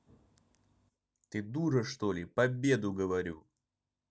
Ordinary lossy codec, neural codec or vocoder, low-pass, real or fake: none; none; none; real